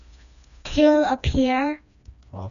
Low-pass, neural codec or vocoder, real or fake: 7.2 kHz; codec, 16 kHz, 2 kbps, FreqCodec, smaller model; fake